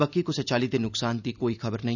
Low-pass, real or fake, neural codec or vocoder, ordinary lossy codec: 7.2 kHz; real; none; none